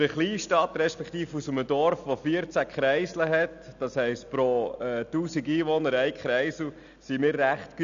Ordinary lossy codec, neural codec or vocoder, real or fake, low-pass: none; none; real; 7.2 kHz